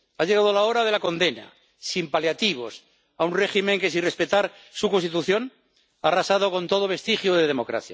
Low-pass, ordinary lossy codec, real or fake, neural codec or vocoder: none; none; real; none